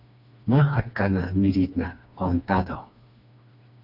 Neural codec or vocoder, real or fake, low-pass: codec, 16 kHz, 2 kbps, FreqCodec, smaller model; fake; 5.4 kHz